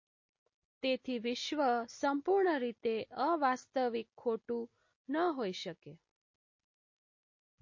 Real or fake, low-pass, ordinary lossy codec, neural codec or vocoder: real; 7.2 kHz; MP3, 32 kbps; none